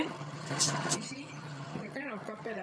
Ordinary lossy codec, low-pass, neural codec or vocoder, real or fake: none; none; vocoder, 22.05 kHz, 80 mel bands, HiFi-GAN; fake